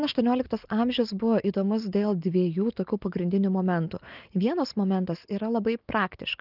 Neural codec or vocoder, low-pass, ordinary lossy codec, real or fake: none; 5.4 kHz; Opus, 24 kbps; real